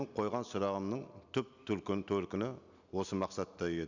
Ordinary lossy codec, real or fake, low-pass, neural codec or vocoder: none; real; 7.2 kHz; none